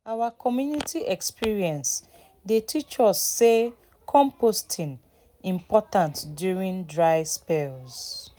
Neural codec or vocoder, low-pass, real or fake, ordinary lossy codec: none; none; real; none